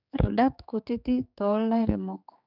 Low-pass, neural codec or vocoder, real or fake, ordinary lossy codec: 5.4 kHz; codec, 16 kHz, 4 kbps, X-Codec, HuBERT features, trained on general audio; fake; none